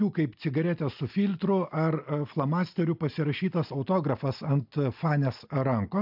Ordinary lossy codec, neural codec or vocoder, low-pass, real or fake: AAC, 48 kbps; none; 5.4 kHz; real